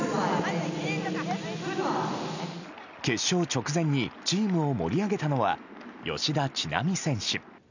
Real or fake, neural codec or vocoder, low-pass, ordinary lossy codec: real; none; 7.2 kHz; none